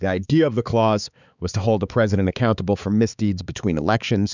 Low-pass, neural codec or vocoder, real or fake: 7.2 kHz; codec, 16 kHz, 4 kbps, X-Codec, HuBERT features, trained on balanced general audio; fake